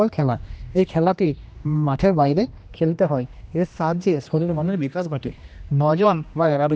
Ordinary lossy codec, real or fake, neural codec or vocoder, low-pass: none; fake; codec, 16 kHz, 1 kbps, X-Codec, HuBERT features, trained on general audio; none